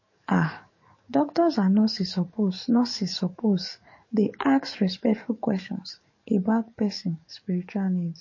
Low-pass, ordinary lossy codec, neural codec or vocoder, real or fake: 7.2 kHz; MP3, 32 kbps; codec, 44.1 kHz, 7.8 kbps, DAC; fake